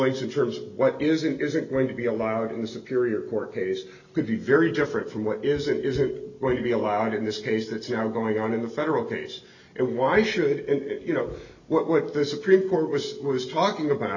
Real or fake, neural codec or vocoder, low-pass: real; none; 7.2 kHz